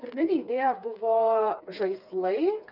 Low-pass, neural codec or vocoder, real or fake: 5.4 kHz; codec, 16 kHz, 4 kbps, FreqCodec, smaller model; fake